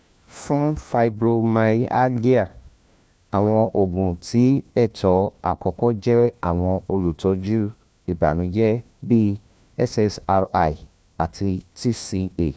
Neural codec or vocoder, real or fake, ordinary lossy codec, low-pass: codec, 16 kHz, 1 kbps, FunCodec, trained on LibriTTS, 50 frames a second; fake; none; none